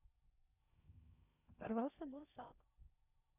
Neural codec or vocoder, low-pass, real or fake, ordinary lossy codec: codec, 16 kHz in and 24 kHz out, 0.6 kbps, FocalCodec, streaming, 2048 codes; 3.6 kHz; fake; none